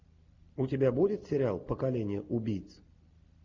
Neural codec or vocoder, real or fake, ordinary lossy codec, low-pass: none; real; MP3, 64 kbps; 7.2 kHz